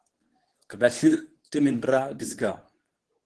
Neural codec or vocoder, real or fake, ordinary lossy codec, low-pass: codec, 24 kHz, 0.9 kbps, WavTokenizer, medium speech release version 2; fake; Opus, 16 kbps; 10.8 kHz